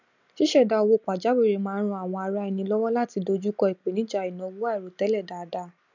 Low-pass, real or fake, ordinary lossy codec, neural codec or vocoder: 7.2 kHz; real; none; none